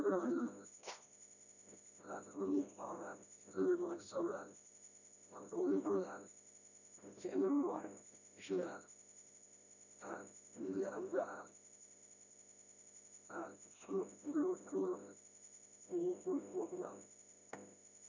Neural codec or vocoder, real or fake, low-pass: codec, 16 kHz, 1 kbps, FreqCodec, smaller model; fake; 7.2 kHz